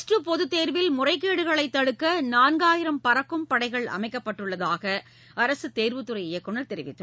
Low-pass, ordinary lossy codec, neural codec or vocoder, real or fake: none; none; none; real